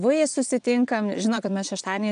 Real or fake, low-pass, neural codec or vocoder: fake; 9.9 kHz; vocoder, 22.05 kHz, 80 mel bands, Vocos